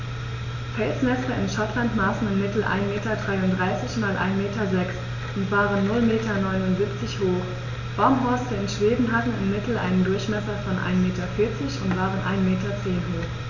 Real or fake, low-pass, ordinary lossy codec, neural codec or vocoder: real; 7.2 kHz; none; none